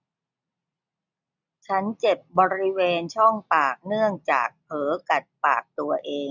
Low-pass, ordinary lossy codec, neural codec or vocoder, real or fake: 7.2 kHz; none; none; real